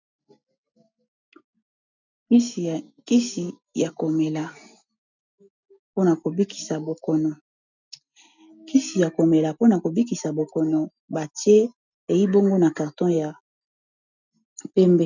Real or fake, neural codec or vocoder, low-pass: real; none; 7.2 kHz